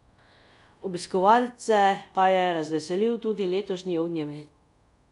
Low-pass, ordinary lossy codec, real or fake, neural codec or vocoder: 10.8 kHz; none; fake; codec, 24 kHz, 0.5 kbps, DualCodec